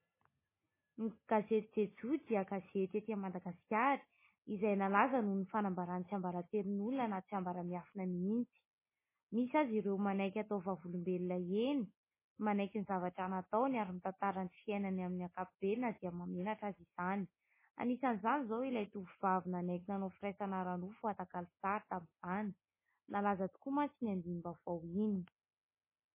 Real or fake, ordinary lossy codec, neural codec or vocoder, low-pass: real; MP3, 16 kbps; none; 3.6 kHz